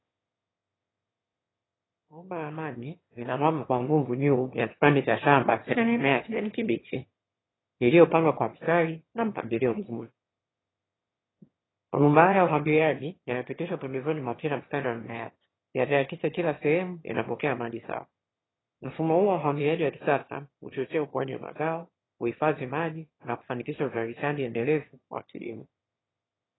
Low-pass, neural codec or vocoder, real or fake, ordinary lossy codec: 7.2 kHz; autoencoder, 22.05 kHz, a latent of 192 numbers a frame, VITS, trained on one speaker; fake; AAC, 16 kbps